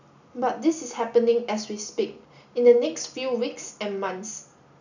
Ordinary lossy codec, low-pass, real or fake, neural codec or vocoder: none; 7.2 kHz; real; none